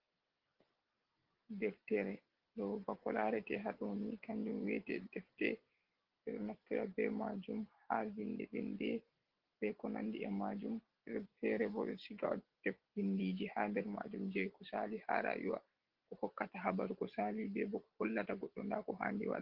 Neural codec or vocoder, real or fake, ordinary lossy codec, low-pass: none; real; Opus, 16 kbps; 5.4 kHz